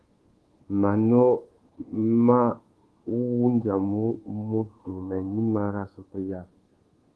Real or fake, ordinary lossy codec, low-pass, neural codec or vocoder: fake; Opus, 16 kbps; 10.8 kHz; codec, 24 kHz, 1.2 kbps, DualCodec